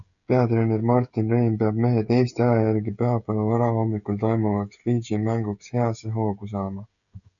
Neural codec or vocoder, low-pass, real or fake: codec, 16 kHz, 16 kbps, FreqCodec, smaller model; 7.2 kHz; fake